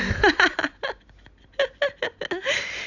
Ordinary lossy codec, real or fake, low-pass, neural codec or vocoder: none; real; 7.2 kHz; none